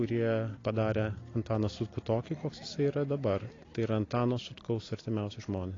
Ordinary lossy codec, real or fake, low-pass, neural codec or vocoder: AAC, 32 kbps; real; 7.2 kHz; none